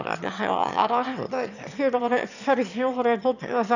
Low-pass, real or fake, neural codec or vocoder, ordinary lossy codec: 7.2 kHz; fake; autoencoder, 22.05 kHz, a latent of 192 numbers a frame, VITS, trained on one speaker; none